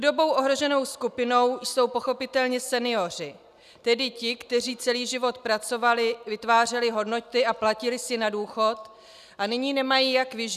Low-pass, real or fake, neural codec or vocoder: 14.4 kHz; real; none